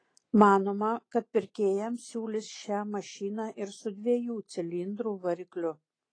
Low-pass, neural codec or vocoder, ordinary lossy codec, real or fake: 9.9 kHz; none; AAC, 32 kbps; real